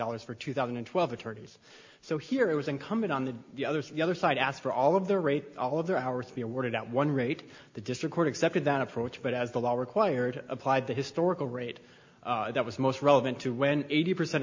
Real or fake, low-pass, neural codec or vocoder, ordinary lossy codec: real; 7.2 kHz; none; MP3, 48 kbps